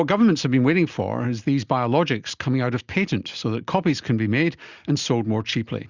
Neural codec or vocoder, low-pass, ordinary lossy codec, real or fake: none; 7.2 kHz; Opus, 64 kbps; real